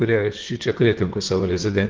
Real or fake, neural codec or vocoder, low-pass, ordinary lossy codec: fake; vocoder, 44.1 kHz, 128 mel bands, Pupu-Vocoder; 7.2 kHz; Opus, 16 kbps